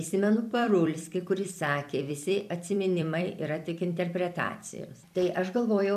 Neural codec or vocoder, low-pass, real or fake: vocoder, 44.1 kHz, 128 mel bands every 256 samples, BigVGAN v2; 14.4 kHz; fake